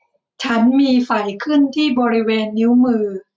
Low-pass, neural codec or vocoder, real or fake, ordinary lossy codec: none; none; real; none